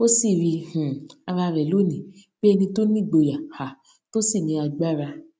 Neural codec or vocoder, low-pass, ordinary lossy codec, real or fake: none; none; none; real